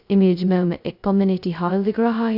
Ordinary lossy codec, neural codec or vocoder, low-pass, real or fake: none; codec, 16 kHz, 0.2 kbps, FocalCodec; 5.4 kHz; fake